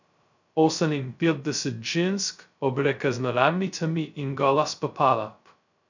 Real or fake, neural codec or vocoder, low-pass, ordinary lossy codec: fake; codec, 16 kHz, 0.2 kbps, FocalCodec; 7.2 kHz; none